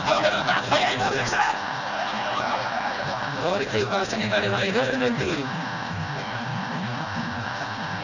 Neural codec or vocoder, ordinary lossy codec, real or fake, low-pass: codec, 16 kHz, 1 kbps, FreqCodec, smaller model; none; fake; 7.2 kHz